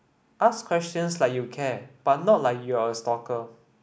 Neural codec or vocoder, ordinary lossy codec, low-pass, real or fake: none; none; none; real